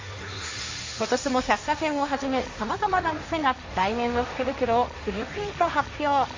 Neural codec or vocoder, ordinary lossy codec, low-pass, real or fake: codec, 16 kHz, 1.1 kbps, Voila-Tokenizer; MP3, 48 kbps; 7.2 kHz; fake